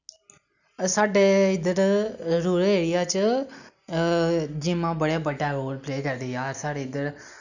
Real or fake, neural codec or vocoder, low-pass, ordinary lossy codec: real; none; 7.2 kHz; none